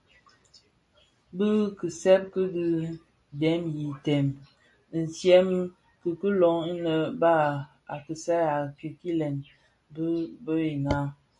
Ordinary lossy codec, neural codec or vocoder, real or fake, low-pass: MP3, 48 kbps; none; real; 10.8 kHz